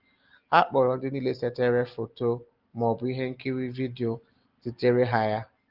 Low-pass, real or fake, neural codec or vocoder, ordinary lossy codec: 5.4 kHz; real; none; Opus, 32 kbps